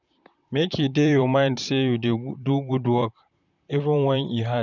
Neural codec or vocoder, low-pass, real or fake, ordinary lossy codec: vocoder, 44.1 kHz, 128 mel bands, Pupu-Vocoder; 7.2 kHz; fake; none